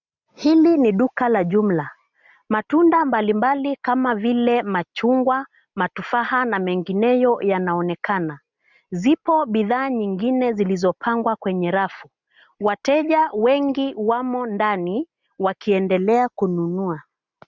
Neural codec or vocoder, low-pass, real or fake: none; 7.2 kHz; real